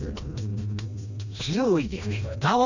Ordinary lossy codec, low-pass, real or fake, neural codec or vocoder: none; 7.2 kHz; fake; codec, 16 kHz, 1 kbps, FreqCodec, smaller model